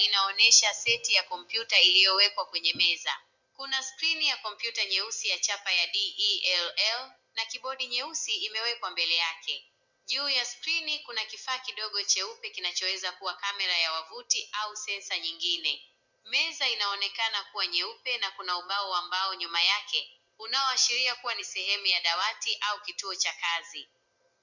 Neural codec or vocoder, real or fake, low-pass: none; real; 7.2 kHz